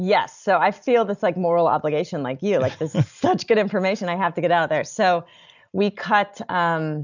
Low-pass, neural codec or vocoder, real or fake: 7.2 kHz; none; real